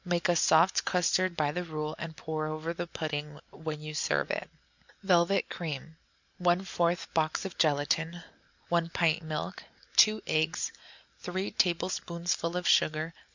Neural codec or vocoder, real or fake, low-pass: none; real; 7.2 kHz